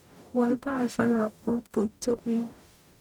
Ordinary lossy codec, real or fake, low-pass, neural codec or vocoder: none; fake; 19.8 kHz; codec, 44.1 kHz, 0.9 kbps, DAC